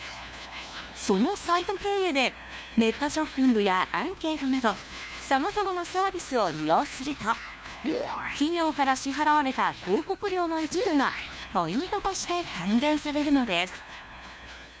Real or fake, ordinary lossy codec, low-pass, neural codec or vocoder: fake; none; none; codec, 16 kHz, 1 kbps, FunCodec, trained on LibriTTS, 50 frames a second